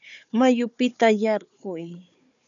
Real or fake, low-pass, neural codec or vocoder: fake; 7.2 kHz; codec, 16 kHz, 4 kbps, FunCodec, trained on Chinese and English, 50 frames a second